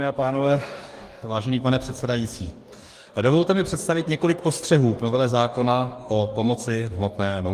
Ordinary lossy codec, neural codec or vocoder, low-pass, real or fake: Opus, 32 kbps; codec, 44.1 kHz, 2.6 kbps, DAC; 14.4 kHz; fake